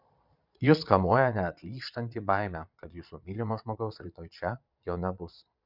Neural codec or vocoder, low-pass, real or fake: vocoder, 22.05 kHz, 80 mel bands, Vocos; 5.4 kHz; fake